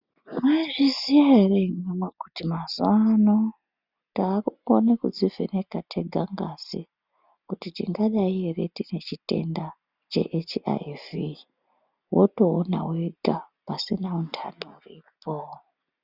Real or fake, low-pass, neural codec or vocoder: real; 5.4 kHz; none